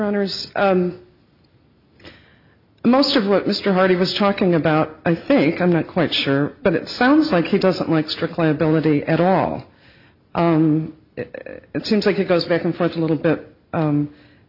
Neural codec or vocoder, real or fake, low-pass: none; real; 5.4 kHz